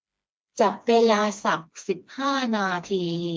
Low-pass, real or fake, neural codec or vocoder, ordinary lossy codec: none; fake; codec, 16 kHz, 2 kbps, FreqCodec, smaller model; none